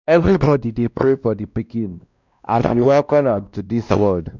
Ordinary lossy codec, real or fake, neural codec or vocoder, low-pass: none; fake; codec, 16 kHz, 1 kbps, X-Codec, WavLM features, trained on Multilingual LibriSpeech; 7.2 kHz